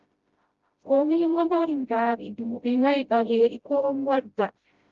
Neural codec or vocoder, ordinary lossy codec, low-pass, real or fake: codec, 16 kHz, 0.5 kbps, FreqCodec, smaller model; Opus, 32 kbps; 7.2 kHz; fake